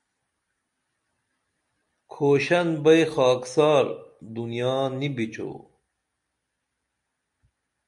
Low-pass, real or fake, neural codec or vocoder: 10.8 kHz; real; none